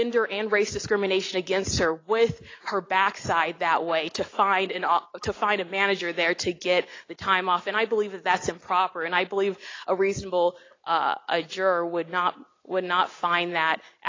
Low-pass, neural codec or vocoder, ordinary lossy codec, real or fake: 7.2 kHz; none; AAC, 32 kbps; real